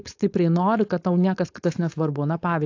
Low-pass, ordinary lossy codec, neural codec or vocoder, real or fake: 7.2 kHz; AAC, 48 kbps; codec, 16 kHz, 4.8 kbps, FACodec; fake